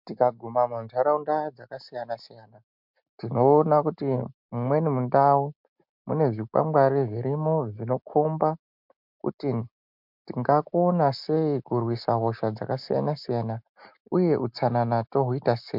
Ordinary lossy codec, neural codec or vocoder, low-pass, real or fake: MP3, 48 kbps; none; 5.4 kHz; real